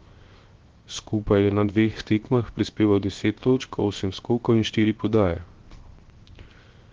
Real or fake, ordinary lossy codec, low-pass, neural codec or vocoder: fake; Opus, 16 kbps; 7.2 kHz; codec, 16 kHz, 0.7 kbps, FocalCodec